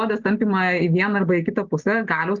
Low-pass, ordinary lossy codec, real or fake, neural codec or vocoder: 7.2 kHz; Opus, 32 kbps; real; none